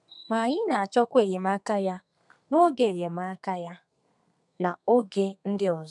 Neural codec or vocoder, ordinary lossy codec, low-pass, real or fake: codec, 32 kHz, 1.9 kbps, SNAC; none; 10.8 kHz; fake